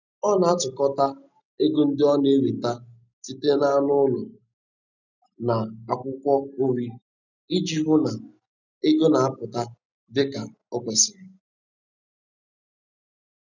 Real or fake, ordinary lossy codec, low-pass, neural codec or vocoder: real; none; 7.2 kHz; none